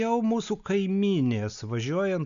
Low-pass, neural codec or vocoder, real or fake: 7.2 kHz; none; real